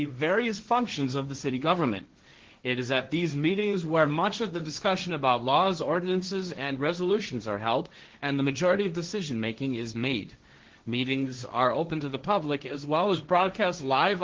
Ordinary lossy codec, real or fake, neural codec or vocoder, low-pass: Opus, 16 kbps; fake; codec, 16 kHz, 1.1 kbps, Voila-Tokenizer; 7.2 kHz